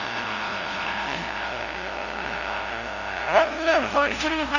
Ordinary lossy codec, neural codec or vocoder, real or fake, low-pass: none; codec, 16 kHz, 0.5 kbps, FunCodec, trained on LibriTTS, 25 frames a second; fake; 7.2 kHz